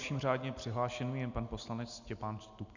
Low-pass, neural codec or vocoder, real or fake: 7.2 kHz; none; real